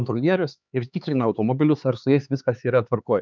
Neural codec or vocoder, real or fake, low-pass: codec, 16 kHz, 4 kbps, X-Codec, HuBERT features, trained on LibriSpeech; fake; 7.2 kHz